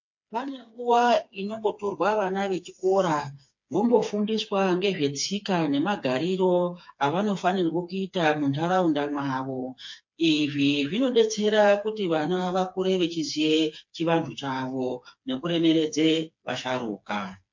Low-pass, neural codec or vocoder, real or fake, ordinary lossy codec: 7.2 kHz; codec, 16 kHz, 4 kbps, FreqCodec, smaller model; fake; MP3, 48 kbps